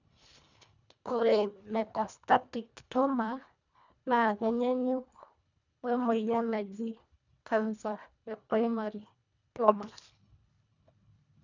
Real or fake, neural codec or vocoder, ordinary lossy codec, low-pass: fake; codec, 24 kHz, 1.5 kbps, HILCodec; none; 7.2 kHz